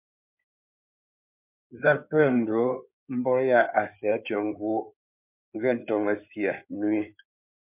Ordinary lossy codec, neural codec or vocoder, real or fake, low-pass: MP3, 32 kbps; codec, 16 kHz in and 24 kHz out, 2.2 kbps, FireRedTTS-2 codec; fake; 3.6 kHz